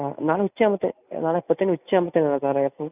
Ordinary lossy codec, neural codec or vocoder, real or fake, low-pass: none; none; real; 3.6 kHz